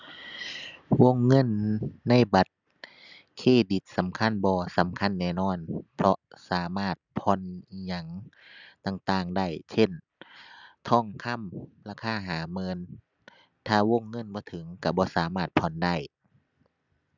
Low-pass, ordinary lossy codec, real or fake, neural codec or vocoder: 7.2 kHz; none; real; none